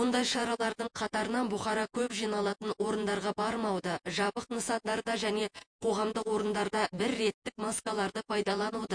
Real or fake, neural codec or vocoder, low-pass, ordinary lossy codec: fake; vocoder, 48 kHz, 128 mel bands, Vocos; 9.9 kHz; MP3, 48 kbps